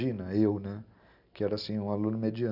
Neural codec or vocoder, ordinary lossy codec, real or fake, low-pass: none; none; real; 5.4 kHz